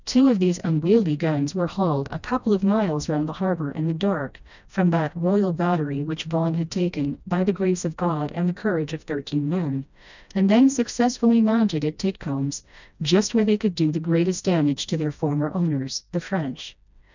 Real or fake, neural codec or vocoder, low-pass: fake; codec, 16 kHz, 1 kbps, FreqCodec, smaller model; 7.2 kHz